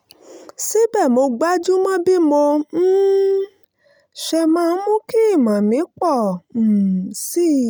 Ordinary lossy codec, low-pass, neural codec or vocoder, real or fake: none; none; none; real